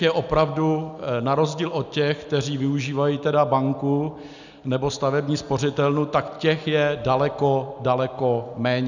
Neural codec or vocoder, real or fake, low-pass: none; real; 7.2 kHz